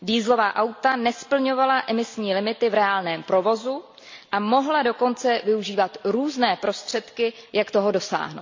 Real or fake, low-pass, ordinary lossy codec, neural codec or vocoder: real; 7.2 kHz; MP3, 48 kbps; none